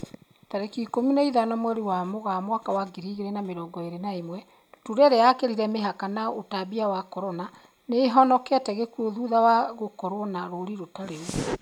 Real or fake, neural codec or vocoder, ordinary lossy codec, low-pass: real; none; none; 19.8 kHz